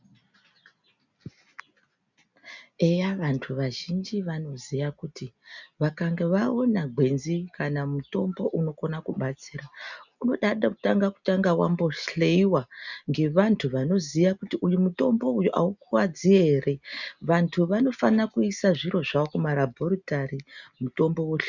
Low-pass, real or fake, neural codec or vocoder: 7.2 kHz; real; none